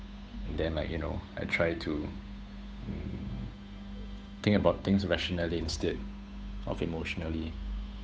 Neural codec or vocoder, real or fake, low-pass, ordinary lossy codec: codec, 16 kHz, 8 kbps, FunCodec, trained on Chinese and English, 25 frames a second; fake; none; none